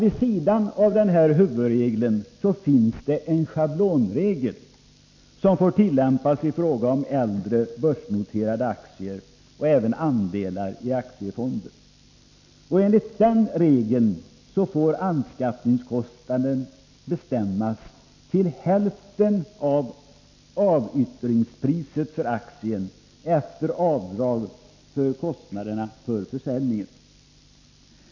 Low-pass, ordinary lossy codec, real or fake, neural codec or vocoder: 7.2 kHz; MP3, 64 kbps; real; none